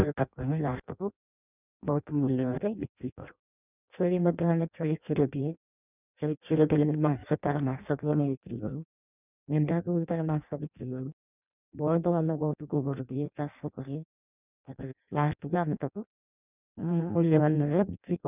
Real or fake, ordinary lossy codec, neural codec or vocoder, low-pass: fake; none; codec, 16 kHz in and 24 kHz out, 0.6 kbps, FireRedTTS-2 codec; 3.6 kHz